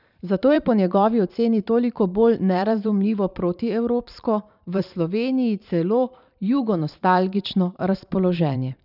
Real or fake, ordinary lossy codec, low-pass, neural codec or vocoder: fake; none; 5.4 kHz; vocoder, 44.1 kHz, 128 mel bands every 512 samples, BigVGAN v2